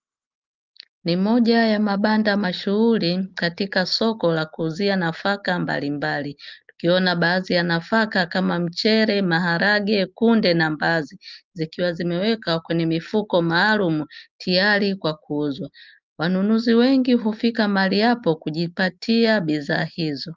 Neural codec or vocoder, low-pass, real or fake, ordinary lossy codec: none; 7.2 kHz; real; Opus, 32 kbps